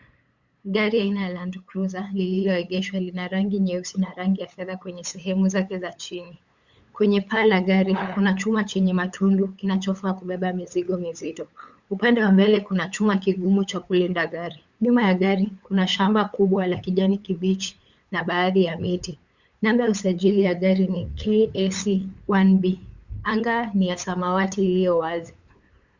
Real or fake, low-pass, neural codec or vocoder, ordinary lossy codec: fake; 7.2 kHz; codec, 16 kHz, 8 kbps, FunCodec, trained on LibriTTS, 25 frames a second; Opus, 64 kbps